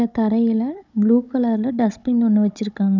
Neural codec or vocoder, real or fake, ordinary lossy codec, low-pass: codec, 16 kHz, 16 kbps, FunCodec, trained on Chinese and English, 50 frames a second; fake; none; 7.2 kHz